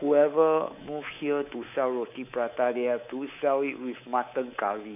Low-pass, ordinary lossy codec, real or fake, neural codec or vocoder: 3.6 kHz; none; fake; codec, 24 kHz, 3.1 kbps, DualCodec